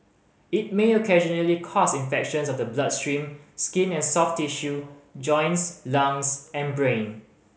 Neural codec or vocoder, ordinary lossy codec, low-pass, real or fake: none; none; none; real